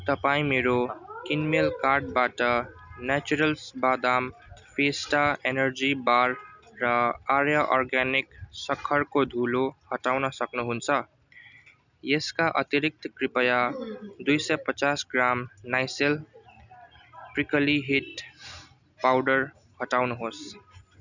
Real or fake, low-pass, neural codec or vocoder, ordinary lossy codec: real; 7.2 kHz; none; none